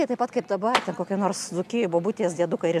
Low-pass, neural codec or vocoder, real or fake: 14.4 kHz; none; real